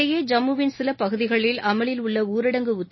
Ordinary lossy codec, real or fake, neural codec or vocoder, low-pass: MP3, 24 kbps; real; none; 7.2 kHz